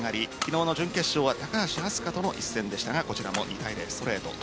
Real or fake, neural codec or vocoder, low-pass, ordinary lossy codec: real; none; none; none